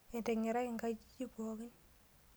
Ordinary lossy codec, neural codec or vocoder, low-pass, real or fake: none; none; none; real